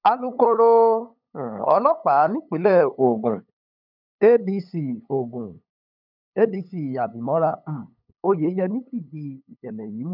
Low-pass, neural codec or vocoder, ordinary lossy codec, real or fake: 5.4 kHz; codec, 16 kHz, 8 kbps, FunCodec, trained on LibriTTS, 25 frames a second; none; fake